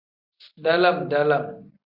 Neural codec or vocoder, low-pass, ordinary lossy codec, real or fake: vocoder, 44.1 kHz, 128 mel bands every 256 samples, BigVGAN v2; 5.4 kHz; Opus, 64 kbps; fake